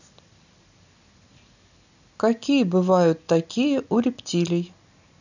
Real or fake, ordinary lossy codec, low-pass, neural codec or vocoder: real; none; 7.2 kHz; none